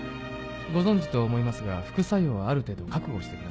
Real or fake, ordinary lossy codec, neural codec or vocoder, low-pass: real; none; none; none